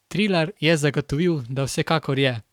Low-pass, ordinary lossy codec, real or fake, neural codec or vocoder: 19.8 kHz; none; fake; codec, 44.1 kHz, 7.8 kbps, Pupu-Codec